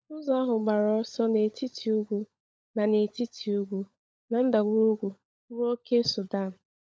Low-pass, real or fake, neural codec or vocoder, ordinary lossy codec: none; fake; codec, 16 kHz, 16 kbps, FunCodec, trained on LibriTTS, 50 frames a second; none